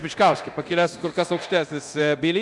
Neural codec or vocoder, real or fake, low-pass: codec, 24 kHz, 0.9 kbps, DualCodec; fake; 10.8 kHz